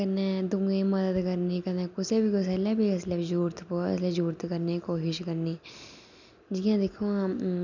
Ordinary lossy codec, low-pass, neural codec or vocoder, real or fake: none; 7.2 kHz; none; real